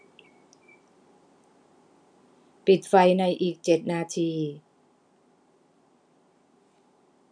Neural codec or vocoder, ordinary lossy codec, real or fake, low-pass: none; none; real; 9.9 kHz